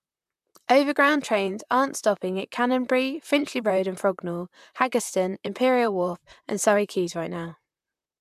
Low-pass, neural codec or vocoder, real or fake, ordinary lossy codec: 14.4 kHz; vocoder, 44.1 kHz, 128 mel bands, Pupu-Vocoder; fake; MP3, 96 kbps